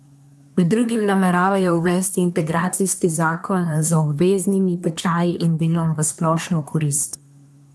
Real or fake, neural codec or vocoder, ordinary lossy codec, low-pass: fake; codec, 24 kHz, 1 kbps, SNAC; none; none